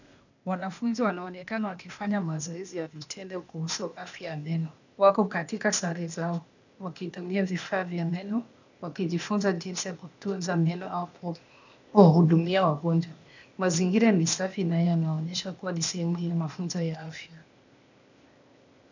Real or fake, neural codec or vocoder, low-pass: fake; codec, 16 kHz, 0.8 kbps, ZipCodec; 7.2 kHz